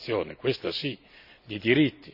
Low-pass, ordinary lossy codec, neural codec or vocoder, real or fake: 5.4 kHz; none; none; real